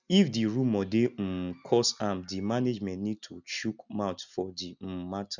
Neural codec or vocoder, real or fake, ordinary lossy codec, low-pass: none; real; none; 7.2 kHz